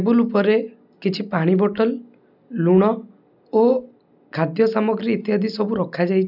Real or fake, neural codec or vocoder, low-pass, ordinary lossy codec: real; none; 5.4 kHz; none